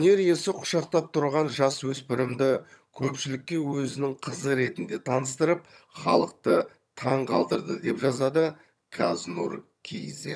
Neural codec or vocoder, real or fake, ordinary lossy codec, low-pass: vocoder, 22.05 kHz, 80 mel bands, HiFi-GAN; fake; none; none